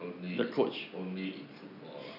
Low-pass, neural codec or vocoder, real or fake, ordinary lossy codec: 5.4 kHz; none; real; none